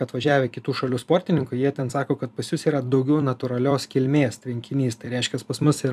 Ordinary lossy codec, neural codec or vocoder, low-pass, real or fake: AAC, 96 kbps; vocoder, 44.1 kHz, 128 mel bands every 256 samples, BigVGAN v2; 14.4 kHz; fake